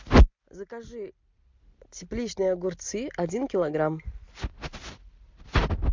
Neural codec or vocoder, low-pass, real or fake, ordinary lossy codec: none; 7.2 kHz; real; MP3, 64 kbps